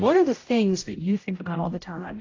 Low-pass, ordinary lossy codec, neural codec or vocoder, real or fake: 7.2 kHz; AAC, 32 kbps; codec, 16 kHz, 0.5 kbps, X-Codec, HuBERT features, trained on general audio; fake